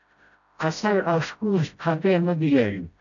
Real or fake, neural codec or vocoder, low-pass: fake; codec, 16 kHz, 0.5 kbps, FreqCodec, smaller model; 7.2 kHz